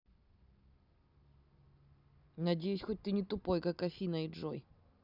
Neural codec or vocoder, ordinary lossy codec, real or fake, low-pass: none; none; real; 5.4 kHz